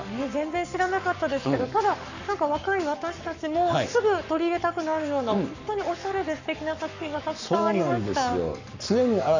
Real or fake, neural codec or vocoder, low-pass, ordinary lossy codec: fake; codec, 44.1 kHz, 7.8 kbps, Pupu-Codec; 7.2 kHz; none